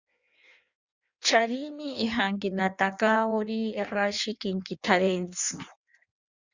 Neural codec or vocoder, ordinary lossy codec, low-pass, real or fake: codec, 16 kHz in and 24 kHz out, 1.1 kbps, FireRedTTS-2 codec; Opus, 64 kbps; 7.2 kHz; fake